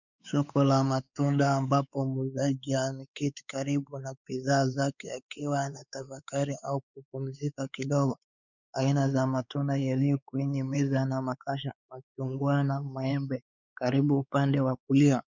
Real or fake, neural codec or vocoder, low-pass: fake; codec, 16 kHz, 4 kbps, X-Codec, WavLM features, trained on Multilingual LibriSpeech; 7.2 kHz